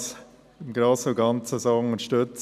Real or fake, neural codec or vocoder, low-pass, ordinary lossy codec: real; none; 14.4 kHz; none